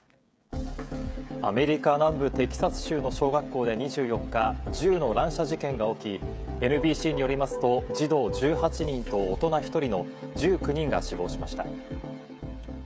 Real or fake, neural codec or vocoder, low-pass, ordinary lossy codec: fake; codec, 16 kHz, 16 kbps, FreqCodec, smaller model; none; none